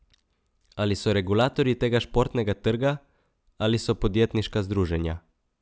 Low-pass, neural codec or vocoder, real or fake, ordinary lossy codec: none; none; real; none